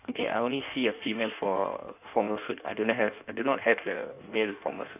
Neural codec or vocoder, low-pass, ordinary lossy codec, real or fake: codec, 16 kHz in and 24 kHz out, 1.1 kbps, FireRedTTS-2 codec; 3.6 kHz; none; fake